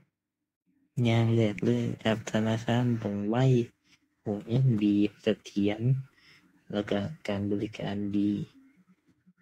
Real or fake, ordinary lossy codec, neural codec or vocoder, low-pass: fake; AAC, 48 kbps; autoencoder, 48 kHz, 32 numbers a frame, DAC-VAE, trained on Japanese speech; 19.8 kHz